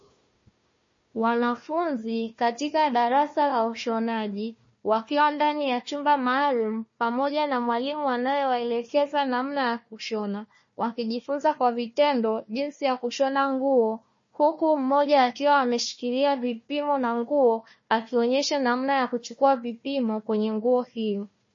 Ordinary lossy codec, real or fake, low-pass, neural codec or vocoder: MP3, 32 kbps; fake; 7.2 kHz; codec, 16 kHz, 1 kbps, FunCodec, trained on Chinese and English, 50 frames a second